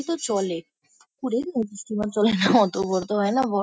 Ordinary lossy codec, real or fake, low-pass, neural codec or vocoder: none; real; none; none